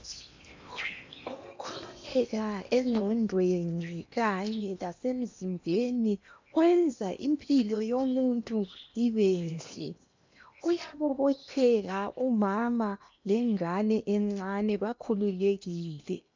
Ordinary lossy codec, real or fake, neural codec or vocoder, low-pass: MP3, 64 kbps; fake; codec, 16 kHz in and 24 kHz out, 0.8 kbps, FocalCodec, streaming, 65536 codes; 7.2 kHz